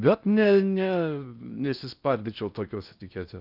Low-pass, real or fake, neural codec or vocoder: 5.4 kHz; fake; codec, 16 kHz in and 24 kHz out, 0.6 kbps, FocalCodec, streaming, 4096 codes